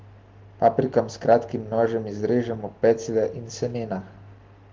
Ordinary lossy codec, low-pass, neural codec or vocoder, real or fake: Opus, 16 kbps; 7.2 kHz; none; real